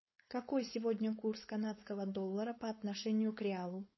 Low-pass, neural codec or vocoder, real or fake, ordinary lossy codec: 7.2 kHz; codec, 16 kHz, 4.8 kbps, FACodec; fake; MP3, 24 kbps